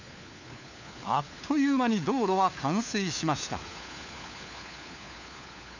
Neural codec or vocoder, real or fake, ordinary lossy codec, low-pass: codec, 16 kHz, 4 kbps, FunCodec, trained on LibriTTS, 50 frames a second; fake; none; 7.2 kHz